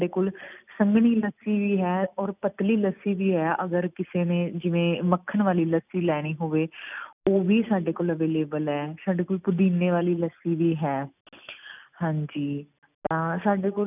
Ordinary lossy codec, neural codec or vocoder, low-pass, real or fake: none; none; 3.6 kHz; real